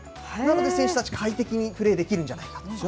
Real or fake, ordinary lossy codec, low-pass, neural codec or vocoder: real; none; none; none